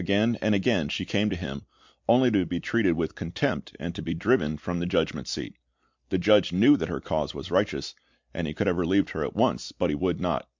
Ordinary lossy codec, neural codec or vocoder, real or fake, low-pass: MP3, 64 kbps; none; real; 7.2 kHz